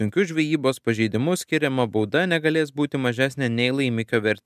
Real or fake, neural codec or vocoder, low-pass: real; none; 14.4 kHz